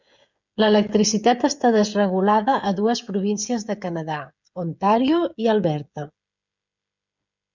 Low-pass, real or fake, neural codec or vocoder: 7.2 kHz; fake; codec, 16 kHz, 16 kbps, FreqCodec, smaller model